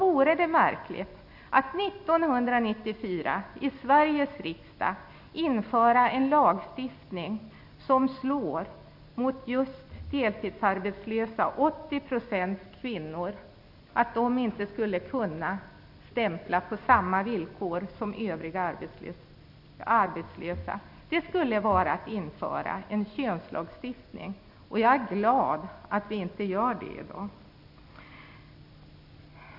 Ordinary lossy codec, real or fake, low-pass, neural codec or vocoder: none; real; 5.4 kHz; none